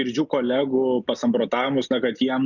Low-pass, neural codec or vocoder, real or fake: 7.2 kHz; none; real